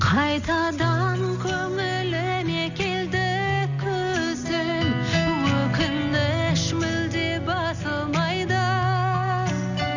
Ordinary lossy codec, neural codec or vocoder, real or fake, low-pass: none; none; real; 7.2 kHz